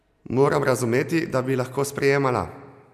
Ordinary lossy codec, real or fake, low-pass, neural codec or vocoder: none; real; 14.4 kHz; none